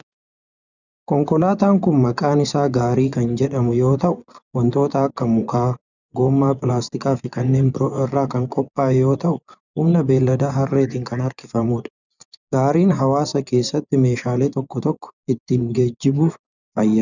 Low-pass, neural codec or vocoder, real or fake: 7.2 kHz; vocoder, 24 kHz, 100 mel bands, Vocos; fake